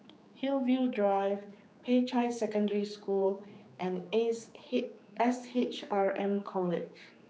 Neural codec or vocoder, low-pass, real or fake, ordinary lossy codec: codec, 16 kHz, 4 kbps, X-Codec, HuBERT features, trained on general audio; none; fake; none